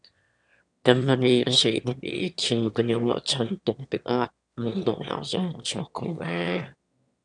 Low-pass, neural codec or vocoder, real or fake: 9.9 kHz; autoencoder, 22.05 kHz, a latent of 192 numbers a frame, VITS, trained on one speaker; fake